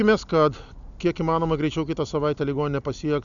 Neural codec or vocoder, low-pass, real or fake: none; 7.2 kHz; real